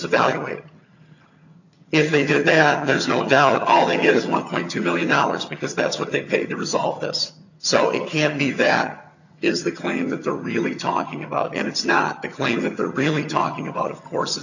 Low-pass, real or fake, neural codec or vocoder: 7.2 kHz; fake; vocoder, 22.05 kHz, 80 mel bands, HiFi-GAN